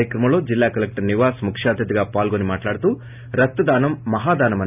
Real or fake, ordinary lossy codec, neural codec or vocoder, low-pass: real; none; none; 3.6 kHz